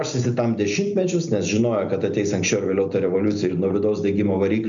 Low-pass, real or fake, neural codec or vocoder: 7.2 kHz; real; none